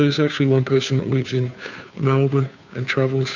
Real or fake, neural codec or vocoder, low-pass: fake; codec, 16 kHz, 2 kbps, FunCodec, trained on Chinese and English, 25 frames a second; 7.2 kHz